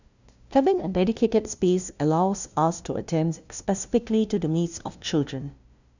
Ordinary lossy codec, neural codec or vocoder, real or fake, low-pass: none; codec, 16 kHz, 0.5 kbps, FunCodec, trained on LibriTTS, 25 frames a second; fake; 7.2 kHz